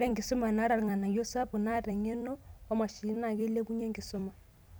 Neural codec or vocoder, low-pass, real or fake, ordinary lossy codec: vocoder, 44.1 kHz, 128 mel bands every 256 samples, BigVGAN v2; none; fake; none